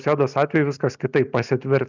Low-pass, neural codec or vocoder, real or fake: 7.2 kHz; none; real